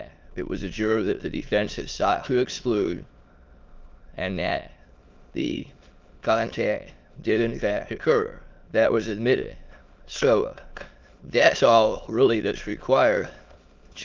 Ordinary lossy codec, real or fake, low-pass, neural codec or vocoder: Opus, 24 kbps; fake; 7.2 kHz; autoencoder, 22.05 kHz, a latent of 192 numbers a frame, VITS, trained on many speakers